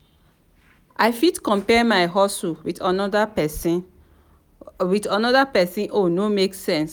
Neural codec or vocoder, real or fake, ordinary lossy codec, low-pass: none; real; none; none